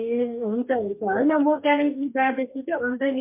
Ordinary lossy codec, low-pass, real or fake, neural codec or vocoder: MP3, 32 kbps; 3.6 kHz; fake; codec, 44.1 kHz, 2.6 kbps, DAC